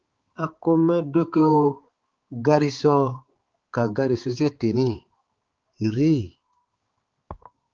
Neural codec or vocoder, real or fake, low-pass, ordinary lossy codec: codec, 16 kHz, 4 kbps, X-Codec, HuBERT features, trained on balanced general audio; fake; 7.2 kHz; Opus, 16 kbps